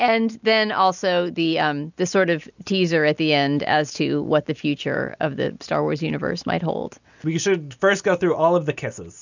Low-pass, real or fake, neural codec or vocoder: 7.2 kHz; real; none